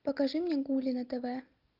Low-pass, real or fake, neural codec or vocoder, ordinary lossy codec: 5.4 kHz; real; none; Opus, 24 kbps